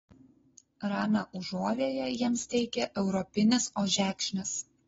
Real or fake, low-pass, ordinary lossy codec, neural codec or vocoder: real; 7.2 kHz; AAC, 24 kbps; none